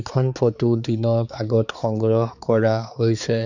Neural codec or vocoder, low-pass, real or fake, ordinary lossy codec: codec, 16 kHz, 4 kbps, X-Codec, HuBERT features, trained on balanced general audio; 7.2 kHz; fake; none